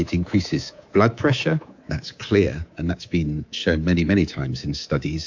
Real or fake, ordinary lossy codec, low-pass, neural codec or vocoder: fake; AAC, 48 kbps; 7.2 kHz; codec, 24 kHz, 3.1 kbps, DualCodec